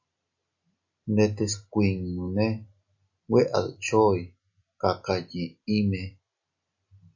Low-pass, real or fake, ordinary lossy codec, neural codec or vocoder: 7.2 kHz; real; AAC, 48 kbps; none